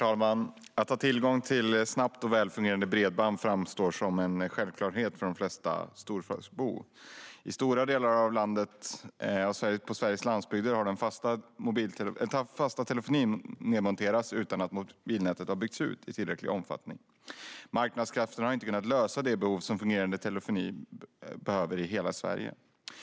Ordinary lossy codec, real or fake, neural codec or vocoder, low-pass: none; real; none; none